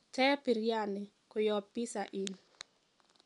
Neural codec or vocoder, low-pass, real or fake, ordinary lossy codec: none; 10.8 kHz; real; none